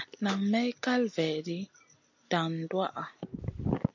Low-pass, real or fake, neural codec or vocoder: 7.2 kHz; real; none